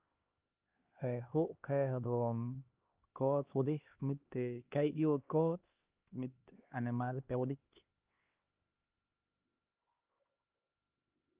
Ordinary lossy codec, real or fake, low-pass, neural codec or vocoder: Opus, 32 kbps; fake; 3.6 kHz; codec, 16 kHz, 2 kbps, X-Codec, HuBERT features, trained on LibriSpeech